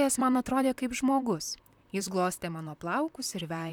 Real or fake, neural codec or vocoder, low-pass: fake; vocoder, 44.1 kHz, 128 mel bands, Pupu-Vocoder; 19.8 kHz